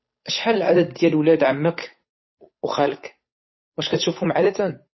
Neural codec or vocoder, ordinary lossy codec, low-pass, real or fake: codec, 16 kHz, 8 kbps, FunCodec, trained on Chinese and English, 25 frames a second; MP3, 24 kbps; 7.2 kHz; fake